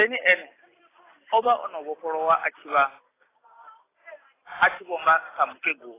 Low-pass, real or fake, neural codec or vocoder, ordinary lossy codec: 3.6 kHz; real; none; AAC, 16 kbps